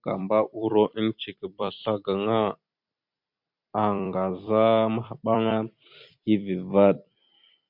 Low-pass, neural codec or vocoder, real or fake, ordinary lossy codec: 5.4 kHz; vocoder, 44.1 kHz, 128 mel bands every 256 samples, BigVGAN v2; fake; MP3, 48 kbps